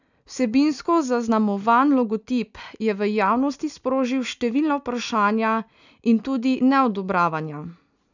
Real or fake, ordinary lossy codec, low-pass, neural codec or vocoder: real; none; 7.2 kHz; none